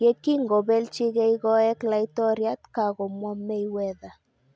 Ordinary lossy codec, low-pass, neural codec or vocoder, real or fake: none; none; none; real